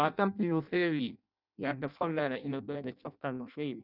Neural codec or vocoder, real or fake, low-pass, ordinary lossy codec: codec, 16 kHz in and 24 kHz out, 0.6 kbps, FireRedTTS-2 codec; fake; 5.4 kHz; none